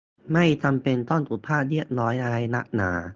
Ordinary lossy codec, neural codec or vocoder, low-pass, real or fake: Opus, 24 kbps; none; 7.2 kHz; real